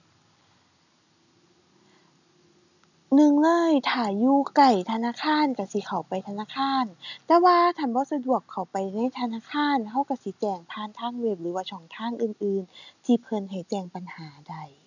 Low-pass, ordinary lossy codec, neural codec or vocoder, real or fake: 7.2 kHz; none; none; real